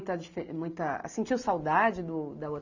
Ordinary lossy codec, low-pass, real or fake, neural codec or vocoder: none; 7.2 kHz; real; none